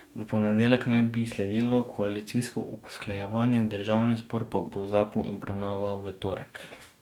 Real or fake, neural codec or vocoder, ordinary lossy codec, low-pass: fake; codec, 44.1 kHz, 2.6 kbps, DAC; none; 19.8 kHz